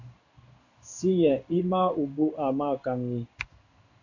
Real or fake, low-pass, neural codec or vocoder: fake; 7.2 kHz; codec, 16 kHz in and 24 kHz out, 1 kbps, XY-Tokenizer